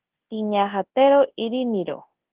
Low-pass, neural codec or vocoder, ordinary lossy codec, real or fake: 3.6 kHz; codec, 24 kHz, 0.9 kbps, WavTokenizer, large speech release; Opus, 16 kbps; fake